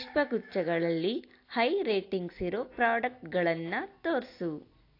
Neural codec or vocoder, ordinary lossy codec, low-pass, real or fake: none; AAC, 32 kbps; 5.4 kHz; real